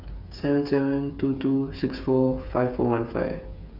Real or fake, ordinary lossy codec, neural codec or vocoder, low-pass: fake; none; codec, 16 kHz, 8 kbps, FreqCodec, smaller model; 5.4 kHz